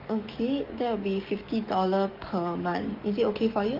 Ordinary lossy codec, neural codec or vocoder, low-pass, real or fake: Opus, 24 kbps; codec, 44.1 kHz, 7.8 kbps, DAC; 5.4 kHz; fake